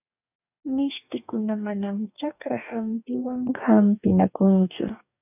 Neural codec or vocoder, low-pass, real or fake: codec, 44.1 kHz, 2.6 kbps, DAC; 3.6 kHz; fake